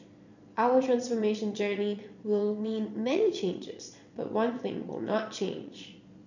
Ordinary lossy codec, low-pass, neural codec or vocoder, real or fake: none; 7.2 kHz; vocoder, 22.05 kHz, 80 mel bands, Vocos; fake